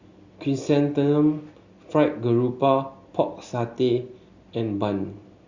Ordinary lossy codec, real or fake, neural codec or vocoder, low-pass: Opus, 64 kbps; real; none; 7.2 kHz